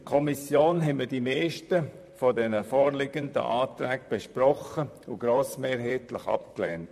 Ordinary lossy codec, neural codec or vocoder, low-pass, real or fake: MP3, 64 kbps; vocoder, 44.1 kHz, 128 mel bands, Pupu-Vocoder; 14.4 kHz; fake